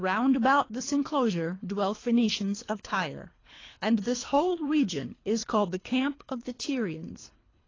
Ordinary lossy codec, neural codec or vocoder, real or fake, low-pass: AAC, 32 kbps; codec, 24 kHz, 3 kbps, HILCodec; fake; 7.2 kHz